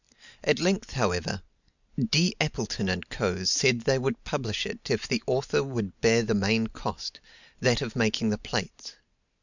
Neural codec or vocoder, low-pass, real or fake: none; 7.2 kHz; real